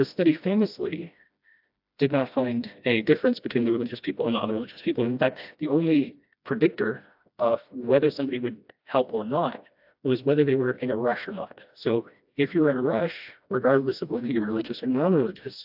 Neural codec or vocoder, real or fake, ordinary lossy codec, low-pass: codec, 16 kHz, 1 kbps, FreqCodec, smaller model; fake; AAC, 48 kbps; 5.4 kHz